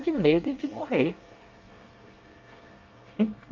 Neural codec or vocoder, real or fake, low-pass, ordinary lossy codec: codec, 24 kHz, 1 kbps, SNAC; fake; 7.2 kHz; Opus, 24 kbps